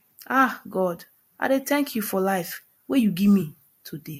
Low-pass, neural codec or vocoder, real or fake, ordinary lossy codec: 19.8 kHz; vocoder, 44.1 kHz, 128 mel bands every 256 samples, BigVGAN v2; fake; MP3, 64 kbps